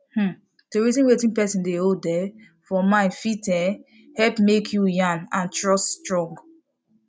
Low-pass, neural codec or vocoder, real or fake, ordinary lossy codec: none; none; real; none